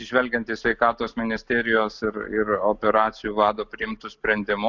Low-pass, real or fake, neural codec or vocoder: 7.2 kHz; real; none